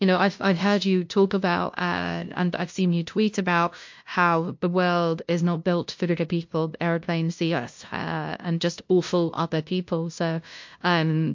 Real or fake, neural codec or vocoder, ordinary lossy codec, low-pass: fake; codec, 16 kHz, 0.5 kbps, FunCodec, trained on LibriTTS, 25 frames a second; MP3, 48 kbps; 7.2 kHz